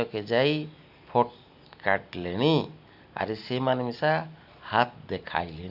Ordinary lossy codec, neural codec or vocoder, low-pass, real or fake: none; none; 5.4 kHz; real